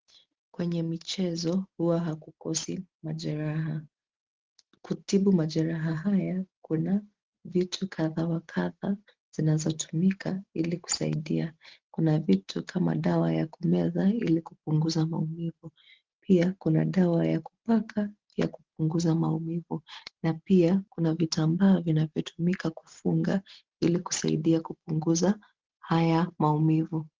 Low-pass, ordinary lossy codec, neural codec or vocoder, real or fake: 7.2 kHz; Opus, 16 kbps; none; real